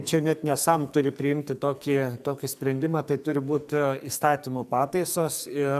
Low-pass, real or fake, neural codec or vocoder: 14.4 kHz; fake; codec, 44.1 kHz, 2.6 kbps, SNAC